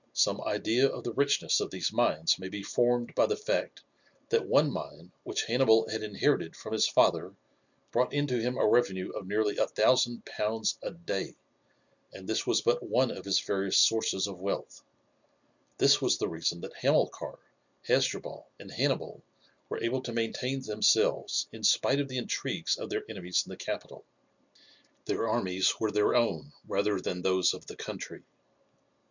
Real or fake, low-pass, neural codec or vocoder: real; 7.2 kHz; none